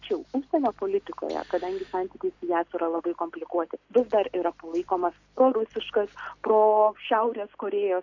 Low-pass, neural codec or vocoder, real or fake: 7.2 kHz; none; real